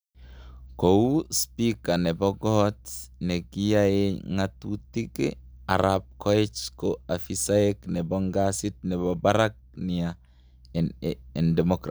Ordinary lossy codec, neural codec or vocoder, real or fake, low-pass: none; none; real; none